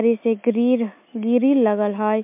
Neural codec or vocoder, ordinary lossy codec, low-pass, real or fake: none; MP3, 32 kbps; 3.6 kHz; real